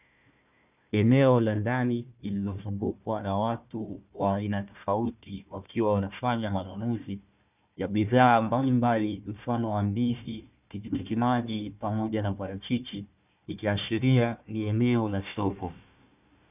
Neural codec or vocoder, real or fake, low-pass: codec, 16 kHz, 1 kbps, FunCodec, trained on Chinese and English, 50 frames a second; fake; 3.6 kHz